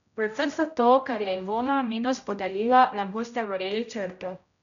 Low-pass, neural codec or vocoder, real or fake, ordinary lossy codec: 7.2 kHz; codec, 16 kHz, 0.5 kbps, X-Codec, HuBERT features, trained on general audio; fake; none